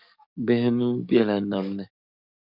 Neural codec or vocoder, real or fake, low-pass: codec, 44.1 kHz, 7.8 kbps, DAC; fake; 5.4 kHz